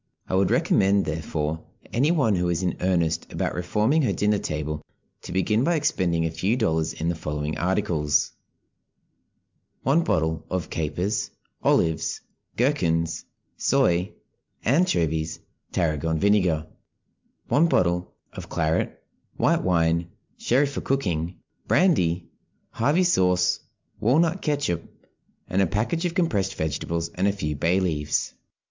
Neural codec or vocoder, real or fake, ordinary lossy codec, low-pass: none; real; MP3, 64 kbps; 7.2 kHz